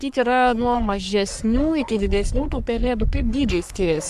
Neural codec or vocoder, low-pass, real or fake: codec, 44.1 kHz, 3.4 kbps, Pupu-Codec; 14.4 kHz; fake